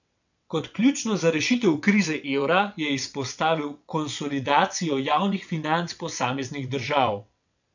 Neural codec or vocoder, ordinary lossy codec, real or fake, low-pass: vocoder, 22.05 kHz, 80 mel bands, WaveNeXt; none; fake; 7.2 kHz